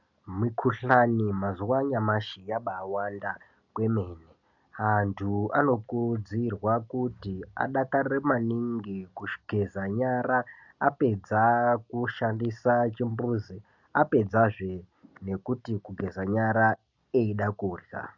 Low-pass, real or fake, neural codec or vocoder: 7.2 kHz; real; none